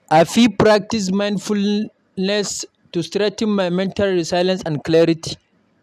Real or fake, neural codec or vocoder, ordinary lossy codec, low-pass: real; none; none; 14.4 kHz